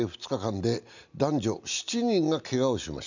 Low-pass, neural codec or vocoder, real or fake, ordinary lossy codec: 7.2 kHz; none; real; none